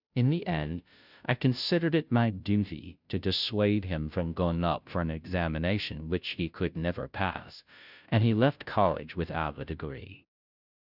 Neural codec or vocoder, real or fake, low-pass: codec, 16 kHz, 0.5 kbps, FunCodec, trained on Chinese and English, 25 frames a second; fake; 5.4 kHz